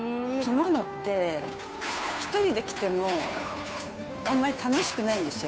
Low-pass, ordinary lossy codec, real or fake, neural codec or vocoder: none; none; fake; codec, 16 kHz, 2 kbps, FunCodec, trained on Chinese and English, 25 frames a second